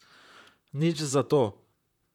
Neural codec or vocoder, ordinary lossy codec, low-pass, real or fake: vocoder, 44.1 kHz, 128 mel bands, Pupu-Vocoder; none; 19.8 kHz; fake